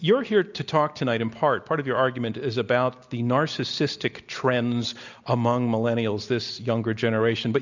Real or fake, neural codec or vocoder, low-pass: real; none; 7.2 kHz